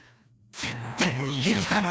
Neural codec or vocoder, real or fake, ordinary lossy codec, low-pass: codec, 16 kHz, 1 kbps, FreqCodec, larger model; fake; none; none